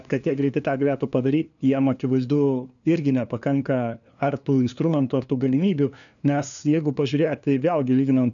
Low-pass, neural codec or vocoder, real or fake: 7.2 kHz; codec, 16 kHz, 2 kbps, FunCodec, trained on LibriTTS, 25 frames a second; fake